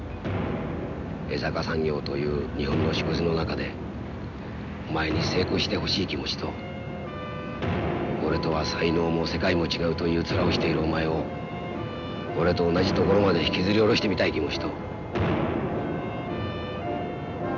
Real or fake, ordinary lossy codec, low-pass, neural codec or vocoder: real; none; 7.2 kHz; none